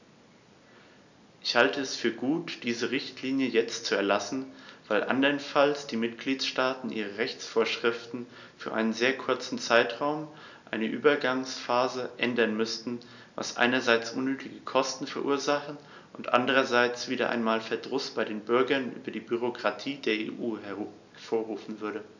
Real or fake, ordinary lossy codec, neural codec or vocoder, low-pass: real; none; none; 7.2 kHz